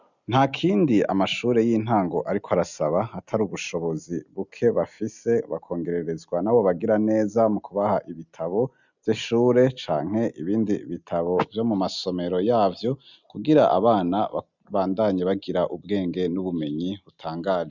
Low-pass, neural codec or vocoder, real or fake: 7.2 kHz; none; real